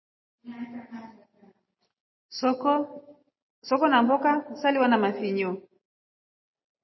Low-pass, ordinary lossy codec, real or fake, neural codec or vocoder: 7.2 kHz; MP3, 24 kbps; real; none